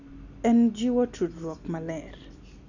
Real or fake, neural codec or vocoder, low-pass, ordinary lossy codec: real; none; 7.2 kHz; none